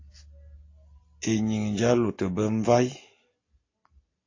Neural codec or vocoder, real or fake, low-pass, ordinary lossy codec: none; real; 7.2 kHz; AAC, 32 kbps